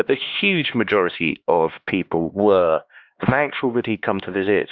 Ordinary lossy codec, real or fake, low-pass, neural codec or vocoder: Opus, 64 kbps; fake; 7.2 kHz; codec, 16 kHz, 2 kbps, X-Codec, HuBERT features, trained on LibriSpeech